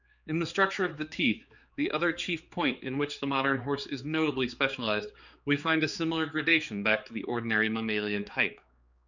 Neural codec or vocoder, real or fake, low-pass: codec, 16 kHz, 4 kbps, X-Codec, HuBERT features, trained on general audio; fake; 7.2 kHz